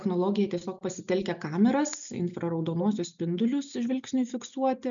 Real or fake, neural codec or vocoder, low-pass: real; none; 7.2 kHz